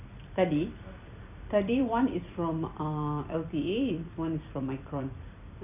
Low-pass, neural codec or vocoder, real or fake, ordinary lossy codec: 3.6 kHz; vocoder, 44.1 kHz, 128 mel bands every 256 samples, BigVGAN v2; fake; none